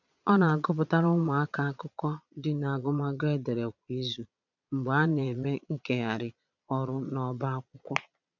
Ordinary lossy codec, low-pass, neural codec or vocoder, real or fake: none; 7.2 kHz; vocoder, 22.05 kHz, 80 mel bands, Vocos; fake